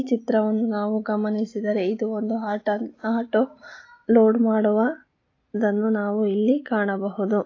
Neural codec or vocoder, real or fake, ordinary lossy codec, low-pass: none; real; AAC, 32 kbps; 7.2 kHz